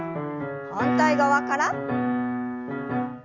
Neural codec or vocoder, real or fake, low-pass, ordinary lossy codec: none; real; 7.2 kHz; Opus, 64 kbps